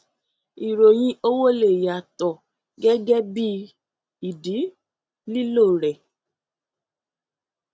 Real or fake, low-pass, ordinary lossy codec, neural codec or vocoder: real; none; none; none